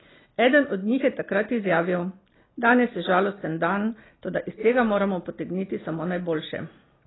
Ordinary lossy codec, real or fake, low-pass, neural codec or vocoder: AAC, 16 kbps; real; 7.2 kHz; none